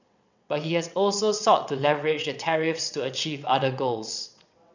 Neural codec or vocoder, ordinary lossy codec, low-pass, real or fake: vocoder, 22.05 kHz, 80 mel bands, WaveNeXt; none; 7.2 kHz; fake